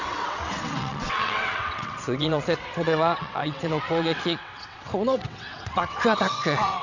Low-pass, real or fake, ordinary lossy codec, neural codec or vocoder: 7.2 kHz; fake; none; vocoder, 22.05 kHz, 80 mel bands, WaveNeXt